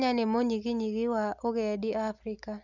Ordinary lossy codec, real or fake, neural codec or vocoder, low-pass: none; real; none; 7.2 kHz